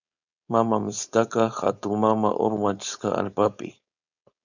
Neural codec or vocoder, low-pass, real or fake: codec, 16 kHz, 4.8 kbps, FACodec; 7.2 kHz; fake